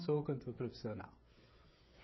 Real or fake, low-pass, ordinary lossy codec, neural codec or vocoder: fake; 7.2 kHz; MP3, 24 kbps; codec, 16 kHz, 16 kbps, FreqCodec, smaller model